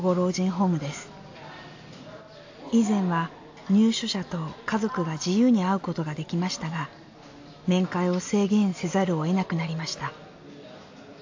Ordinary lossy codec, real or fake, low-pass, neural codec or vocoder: AAC, 48 kbps; real; 7.2 kHz; none